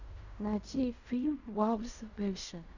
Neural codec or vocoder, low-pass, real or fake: codec, 16 kHz in and 24 kHz out, 0.4 kbps, LongCat-Audio-Codec, fine tuned four codebook decoder; 7.2 kHz; fake